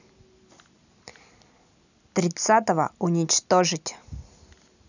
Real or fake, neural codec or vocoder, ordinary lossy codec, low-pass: real; none; none; 7.2 kHz